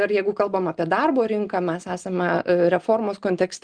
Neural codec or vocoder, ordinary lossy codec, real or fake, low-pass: none; Opus, 24 kbps; real; 9.9 kHz